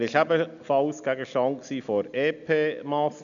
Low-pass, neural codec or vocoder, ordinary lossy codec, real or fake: 7.2 kHz; none; none; real